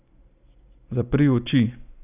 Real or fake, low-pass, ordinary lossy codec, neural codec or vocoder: real; 3.6 kHz; none; none